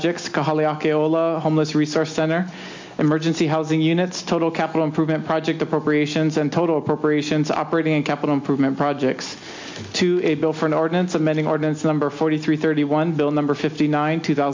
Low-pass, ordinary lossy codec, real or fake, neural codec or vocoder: 7.2 kHz; MP3, 48 kbps; real; none